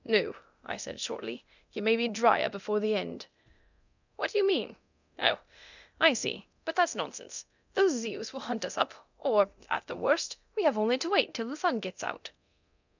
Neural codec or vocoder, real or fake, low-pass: codec, 24 kHz, 0.9 kbps, DualCodec; fake; 7.2 kHz